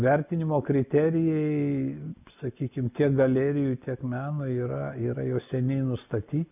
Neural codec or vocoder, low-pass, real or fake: none; 3.6 kHz; real